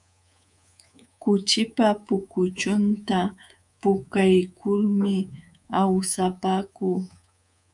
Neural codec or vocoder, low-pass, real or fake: codec, 24 kHz, 3.1 kbps, DualCodec; 10.8 kHz; fake